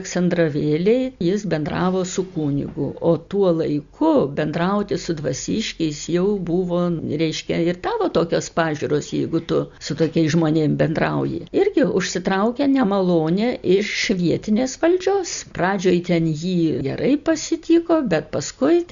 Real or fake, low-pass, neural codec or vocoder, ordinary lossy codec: real; 7.2 kHz; none; Opus, 64 kbps